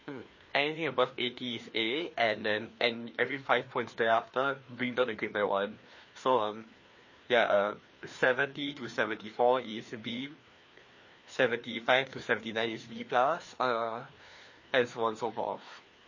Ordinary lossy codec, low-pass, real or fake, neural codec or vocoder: MP3, 32 kbps; 7.2 kHz; fake; codec, 16 kHz, 2 kbps, FreqCodec, larger model